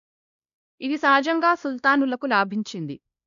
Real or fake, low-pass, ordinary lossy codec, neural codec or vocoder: fake; 7.2 kHz; none; codec, 16 kHz, 1 kbps, X-Codec, WavLM features, trained on Multilingual LibriSpeech